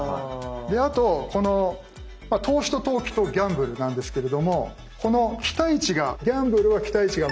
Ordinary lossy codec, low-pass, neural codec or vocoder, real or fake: none; none; none; real